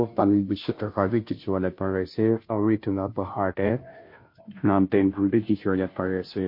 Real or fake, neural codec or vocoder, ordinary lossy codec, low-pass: fake; codec, 16 kHz, 0.5 kbps, FunCodec, trained on Chinese and English, 25 frames a second; MP3, 32 kbps; 5.4 kHz